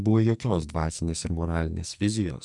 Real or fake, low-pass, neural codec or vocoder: fake; 10.8 kHz; codec, 44.1 kHz, 2.6 kbps, SNAC